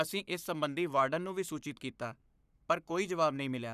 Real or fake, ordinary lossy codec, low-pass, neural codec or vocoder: fake; none; 14.4 kHz; codec, 44.1 kHz, 7.8 kbps, Pupu-Codec